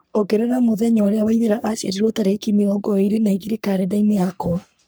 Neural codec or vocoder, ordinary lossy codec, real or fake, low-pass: codec, 44.1 kHz, 3.4 kbps, Pupu-Codec; none; fake; none